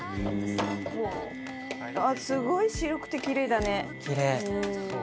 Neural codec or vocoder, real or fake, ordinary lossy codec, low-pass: none; real; none; none